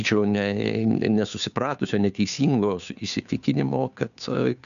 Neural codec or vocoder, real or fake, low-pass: codec, 16 kHz, 2 kbps, FunCodec, trained on Chinese and English, 25 frames a second; fake; 7.2 kHz